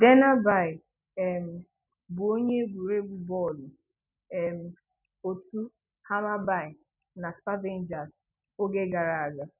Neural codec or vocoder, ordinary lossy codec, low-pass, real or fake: none; none; 3.6 kHz; real